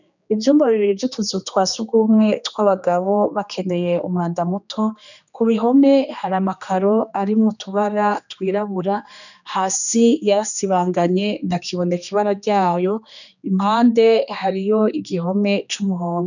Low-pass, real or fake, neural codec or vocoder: 7.2 kHz; fake; codec, 16 kHz, 2 kbps, X-Codec, HuBERT features, trained on general audio